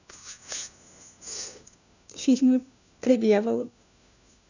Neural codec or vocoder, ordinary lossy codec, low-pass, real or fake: codec, 16 kHz, 1 kbps, FunCodec, trained on LibriTTS, 50 frames a second; none; 7.2 kHz; fake